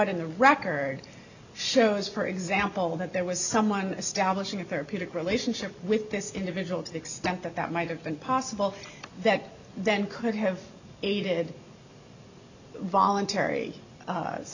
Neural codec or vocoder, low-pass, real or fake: none; 7.2 kHz; real